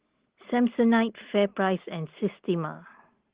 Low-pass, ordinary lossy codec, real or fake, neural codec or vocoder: 3.6 kHz; Opus, 16 kbps; real; none